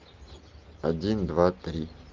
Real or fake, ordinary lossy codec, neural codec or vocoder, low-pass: real; Opus, 32 kbps; none; 7.2 kHz